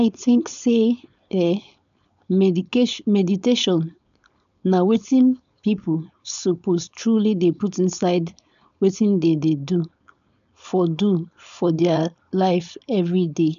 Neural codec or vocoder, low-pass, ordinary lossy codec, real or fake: codec, 16 kHz, 4.8 kbps, FACodec; 7.2 kHz; none; fake